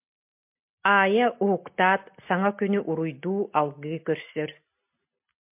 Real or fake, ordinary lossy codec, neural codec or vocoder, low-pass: real; MP3, 32 kbps; none; 3.6 kHz